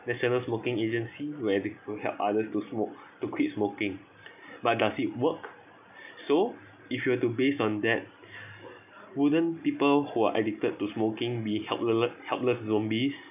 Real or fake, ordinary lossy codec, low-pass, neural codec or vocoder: real; none; 3.6 kHz; none